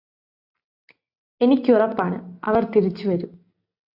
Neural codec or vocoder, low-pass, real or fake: none; 5.4 kHz; real